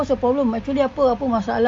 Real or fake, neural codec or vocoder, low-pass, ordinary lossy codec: real; none; 9.9 kHz; none